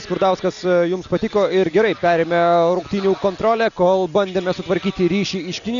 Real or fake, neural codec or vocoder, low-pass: real; none; 7.2 kHz